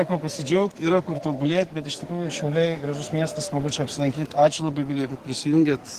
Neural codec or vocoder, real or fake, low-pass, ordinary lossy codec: codec, 44.1 kHz, 2.6 kbps, SNAC; fake; 14.4 kHz; Opus, 16 kbps